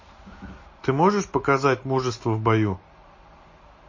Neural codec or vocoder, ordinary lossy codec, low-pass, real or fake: none; MP3, 32 kbps; 7.2 kHz; real